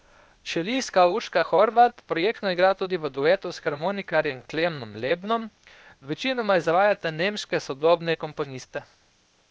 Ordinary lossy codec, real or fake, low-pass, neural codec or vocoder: none; fake; none; codec, 16 kHz, 0.8 kbps, ZipCodec